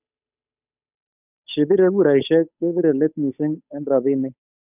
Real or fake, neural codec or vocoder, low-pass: fake; codec, 16 kHz, 8 kbps, FunCodec, trained on Chinese and English, 25 frames a second; 3.6 kHz